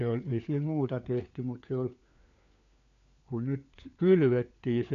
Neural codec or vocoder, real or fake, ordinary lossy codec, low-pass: codec, 16 kHz, 4 kbps, FunCodec, trained on LibriTTS, 50 frames a second; fake; MP3, 96 kbps; 7.2 kHz